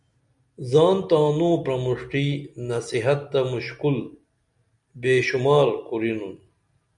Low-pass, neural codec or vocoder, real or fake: 10.8 kHz; none; real